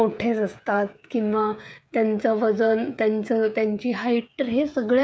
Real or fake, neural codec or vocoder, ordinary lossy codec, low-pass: fake; codec, 16 kHz, 16 kbps, FreqCodec, smaller model; none; none